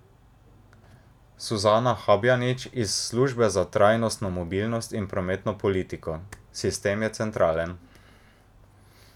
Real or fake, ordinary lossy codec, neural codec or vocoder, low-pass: real; none; none; 19.8 kHz